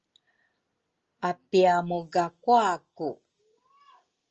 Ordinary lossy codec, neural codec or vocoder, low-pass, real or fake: Opus, 24 kbps; none; 7.2 kHz; real